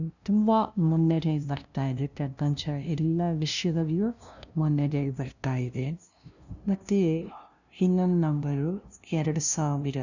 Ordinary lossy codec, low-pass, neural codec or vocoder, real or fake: none; 7.2 kHz; codec, 16 kHz, 0.5 kbps, FunCodec, trained on LibriTTS, 25 frames a second; fake